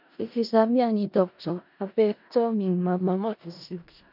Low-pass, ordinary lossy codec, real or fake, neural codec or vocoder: 5.4 kHz; none; fake; codec, 16 kHz in and 24 kHz out, 0.4 kbps, LongCat-Audio-Codec, four codebook decoder